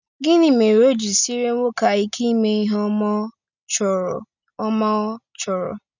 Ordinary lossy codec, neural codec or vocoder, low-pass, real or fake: none; none; 7.2 kHz; real